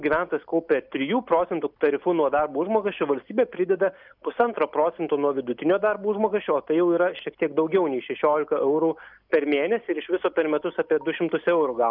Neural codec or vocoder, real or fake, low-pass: none; real; 5.4 kHz